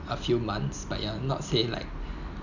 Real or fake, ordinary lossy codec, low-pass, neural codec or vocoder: real; none; 7.2 kHz; none